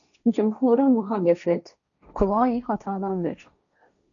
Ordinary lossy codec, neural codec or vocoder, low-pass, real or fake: MP3, 96 kbps; codec, 16 kHz, 1.1 kbps, Voila-Tokenizer; 7.2 kHz; fake